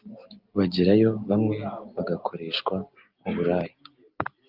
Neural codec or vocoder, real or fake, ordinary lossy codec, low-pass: none; real; Opus, 24 kbps; 5.4 kHz